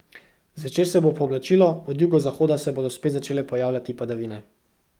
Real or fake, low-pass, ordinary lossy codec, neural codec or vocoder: fake; 19.8 kHz; Opus, 32 kbps; codec, 44.1 kHz, 7.8 kbps, DAC